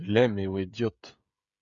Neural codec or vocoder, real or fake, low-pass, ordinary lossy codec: codec, 16 kHz, 16 kbps, FreqCodec, smaller model; fake; 7.2 kHz; Opus, 64 kbps